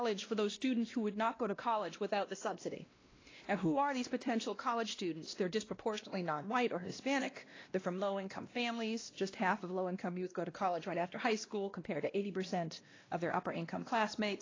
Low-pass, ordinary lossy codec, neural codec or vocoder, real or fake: 7.2 kHz; AAC, 32 kbps; codec, 16 kHz, 1 kbps, X-Codec, WavLM features, trained on Multilingual LibriSpeech; fake